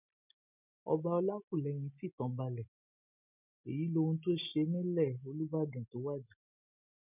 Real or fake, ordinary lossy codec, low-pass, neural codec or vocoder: real; none; 3.6 kHz; none